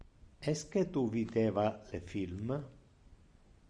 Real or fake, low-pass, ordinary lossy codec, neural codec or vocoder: real; 9.9 kHz; Opus, 64 kbps; none